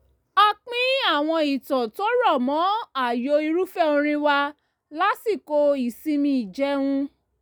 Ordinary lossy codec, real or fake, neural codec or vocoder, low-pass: none; real; none; none